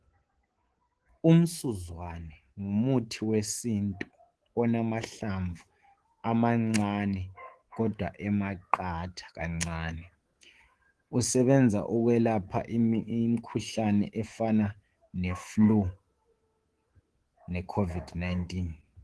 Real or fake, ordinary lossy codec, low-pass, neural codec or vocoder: fake; Opus, 16 kbps; 10.8 kHz; codec, 24 kHz, 3.1 kbps, DualCodec